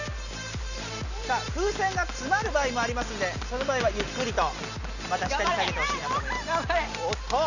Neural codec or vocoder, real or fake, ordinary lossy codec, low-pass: none; real; none; 7.2 kHz